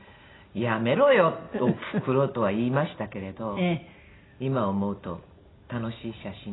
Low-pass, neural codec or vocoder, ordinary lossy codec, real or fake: 7.2 kHz; none; AAC, 16 kbps; real